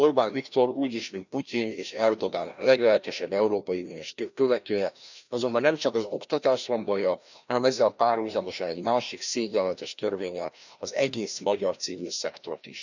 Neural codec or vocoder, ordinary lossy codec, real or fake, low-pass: codec, 16 kHz, 1 kbps, FreqCodec, larger model; none; fake; 7.2 kHz